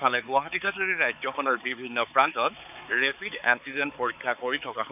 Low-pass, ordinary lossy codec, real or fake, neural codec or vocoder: 3.6 kHz; none; fake; codec, 16 kHz, 4 kbps, X-Codec, HuBERT features, trained on balanced general audio